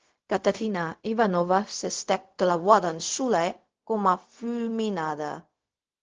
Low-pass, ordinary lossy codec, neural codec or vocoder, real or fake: 7.2 kHz; Opus, 16 kbps; codec, 16 kHz, 0.4 kbps, LongCat-Audio-Codec; fake